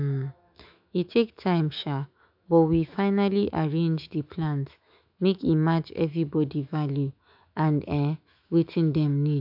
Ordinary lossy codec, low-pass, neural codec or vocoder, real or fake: AAC, 48 kbps; 5.4 kHz; autoencoder, 48 kHz, 128 numbers a frame, DAC-VAE, trained on Japanese speech; fake